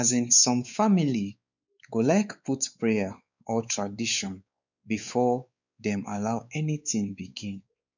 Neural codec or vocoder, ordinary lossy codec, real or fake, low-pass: codec, 16 kHz, 4 kbps, X-Codec, WavLM features, trained on Multilingual LibriSpeech; none; fake; 7.2 kHz